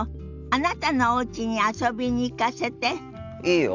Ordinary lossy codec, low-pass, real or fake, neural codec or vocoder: none; 7.2 kHz; real; none